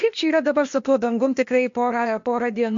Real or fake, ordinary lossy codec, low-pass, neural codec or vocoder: fake; MP3, 48 kbps; 7.2 kHz; codec, 16 kHz, 0.8 kbps, ZipCodec